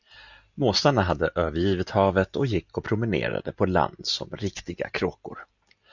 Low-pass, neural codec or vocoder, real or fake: 7.2 kHz; none; real